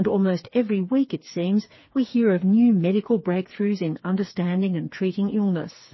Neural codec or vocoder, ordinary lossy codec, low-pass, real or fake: codec, 16 kHz, 4 kbps, FreqCodec, smaller model; MP3, 24 kbps; 7.2 kHz; fake